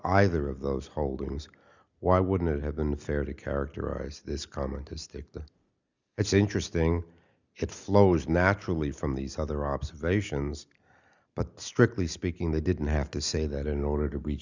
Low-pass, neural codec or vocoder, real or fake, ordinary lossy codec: 7.2 kHz; none; real; Opus, 64 kbps